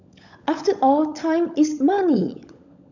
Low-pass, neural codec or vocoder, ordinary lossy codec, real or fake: 7.2 kHz; codec, 16 kHz, 16 kbps, FunCodec, trained on LibriTTS, 50 frames a second; none; fake